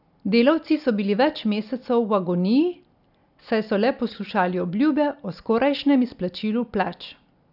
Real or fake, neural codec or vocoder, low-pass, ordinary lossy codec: real; none; 5.4 kHz; none